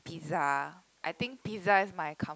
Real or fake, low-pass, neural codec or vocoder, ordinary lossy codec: real; none; none; none